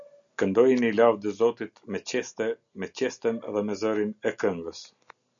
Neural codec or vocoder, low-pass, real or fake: none; 7.2 kHz; real